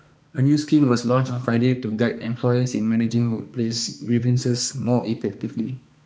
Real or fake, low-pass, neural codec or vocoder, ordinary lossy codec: fake; none; codec, 16 kHz, 2 kbps, X-Codec, HuBERT features, trained on balanced general audio; none